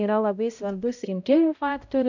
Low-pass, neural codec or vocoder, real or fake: 7.2 kHz; codec, 16 kHz, 0.5 kbps, X-Codec, HuBERT features, trained on balanced general audio; fake